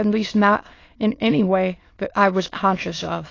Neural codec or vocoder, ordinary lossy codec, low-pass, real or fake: autoencoder, 22.05 kHz, a latent of 192 numbers a frame, VITS, trained on many speakers; AAC, 32 kbps; 7.2 kHz; fake